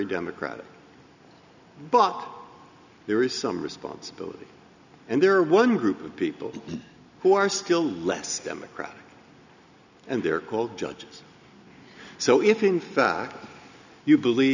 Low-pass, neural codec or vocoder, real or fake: 7.2 kHz; none; real